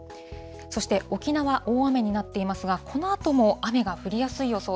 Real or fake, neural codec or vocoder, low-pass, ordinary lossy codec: real; none; none; none